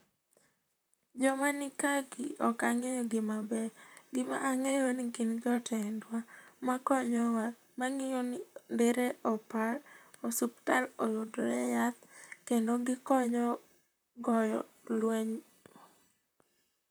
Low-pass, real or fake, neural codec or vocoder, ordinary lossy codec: none; fake; vocoder, 44.1 kHz, 128 mel bands, Pupu-Vocoder; none